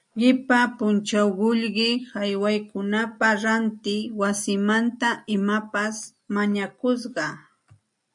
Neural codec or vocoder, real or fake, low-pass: none; real; 10.8 kHz